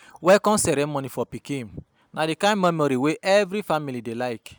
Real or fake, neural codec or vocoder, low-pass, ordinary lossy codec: real; none; none; none